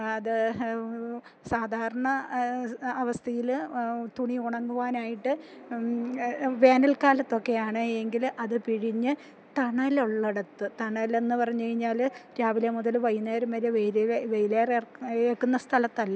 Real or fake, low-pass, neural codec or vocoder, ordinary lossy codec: real; none; none; none